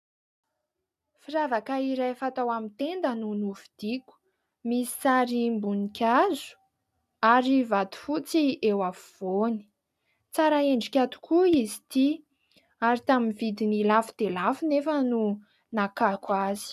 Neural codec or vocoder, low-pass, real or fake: none; 14.4 kHz; real